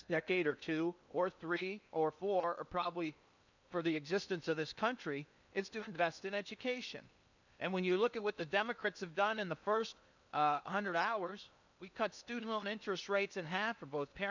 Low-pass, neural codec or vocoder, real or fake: 7.2 kHz; codec, 16 kHz in and 24 kHz out, 0.8 kbps, FocalCodec, streaming, 65536 codes; fake